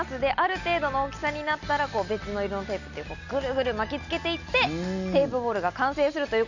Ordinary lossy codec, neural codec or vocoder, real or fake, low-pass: none; none; real; 7.2 kHz